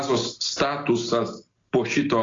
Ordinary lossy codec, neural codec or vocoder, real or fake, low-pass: AAC, 32 kbps; none; real; 7.2 kHz